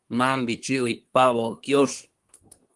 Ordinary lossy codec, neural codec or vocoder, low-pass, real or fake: Opus, 24 kbps; codec, 24 kHz, 1 kbps, SNAC; 10.8 kHz; fake